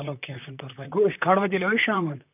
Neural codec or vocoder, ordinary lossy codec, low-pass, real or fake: vocoder, 44.1 kHz, 128 mel bands, Pupu-Vocoder; none; 3.6 kHz; fake